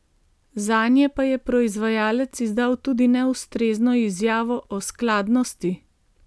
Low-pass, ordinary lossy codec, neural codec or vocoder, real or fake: none; none; none; real